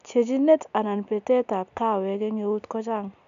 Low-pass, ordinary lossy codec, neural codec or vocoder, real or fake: 7.2 kHz; MP3, 96 kbps; none; real